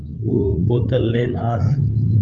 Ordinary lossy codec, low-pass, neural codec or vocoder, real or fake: Opus, 24 kbps; 7.2 kHz; codec, 16 kHz, 8 kbps, FreqCodec, larger model; fake